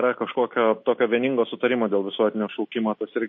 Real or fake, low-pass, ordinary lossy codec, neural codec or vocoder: real; 7.2 kHz; MP3, 32 kbps; none